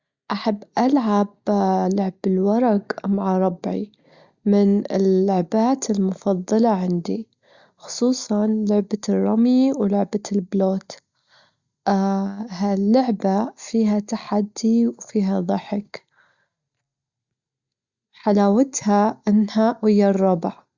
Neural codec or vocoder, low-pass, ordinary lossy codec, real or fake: none; 7.2 kHz; Opus, 64 kbps; real